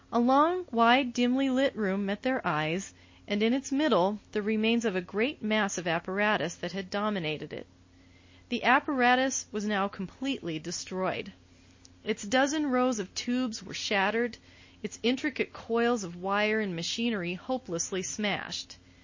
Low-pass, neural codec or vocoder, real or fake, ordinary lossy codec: 7.2 kHz; none; real; MP3, 32 kbps